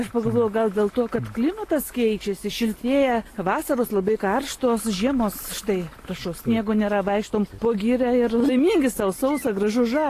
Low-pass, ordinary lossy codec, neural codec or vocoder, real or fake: 14.4 kHz; AAC, 48 kbps; none; real